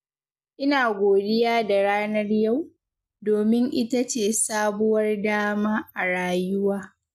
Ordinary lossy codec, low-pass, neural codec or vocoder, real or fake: none; 14.4 kHz; none; real